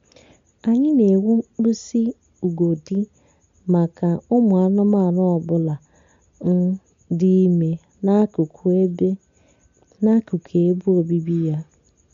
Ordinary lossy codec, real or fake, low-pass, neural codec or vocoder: MP3, 48 kbps; real; 7.2 kHz; none